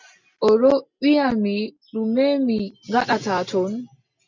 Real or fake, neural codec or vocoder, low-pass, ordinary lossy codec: real; none; 7.2 kHz; MP3, 64 kbps